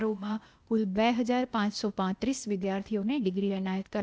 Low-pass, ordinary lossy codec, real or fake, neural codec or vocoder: none; none; fake; codec, 16 kHz, 0.8 kbps, ZipCodec